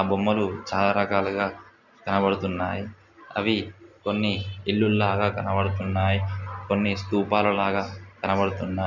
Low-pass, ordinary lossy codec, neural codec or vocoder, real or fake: 7.2 kHz; none; none; real